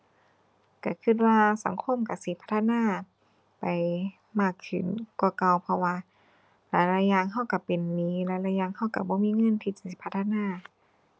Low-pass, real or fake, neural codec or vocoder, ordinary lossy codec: none; real; none; none